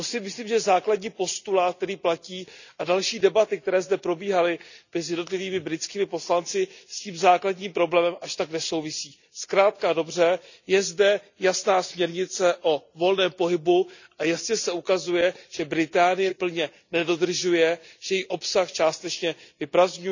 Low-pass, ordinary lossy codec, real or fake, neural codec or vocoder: 7.2 kHz; none; real; none